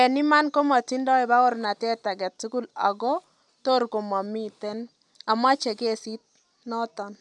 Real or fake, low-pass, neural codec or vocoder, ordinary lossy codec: real; 10.8 kHz; none; none